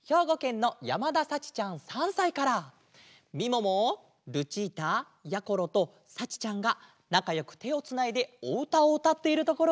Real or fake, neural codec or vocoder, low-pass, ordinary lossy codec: real; none; none; none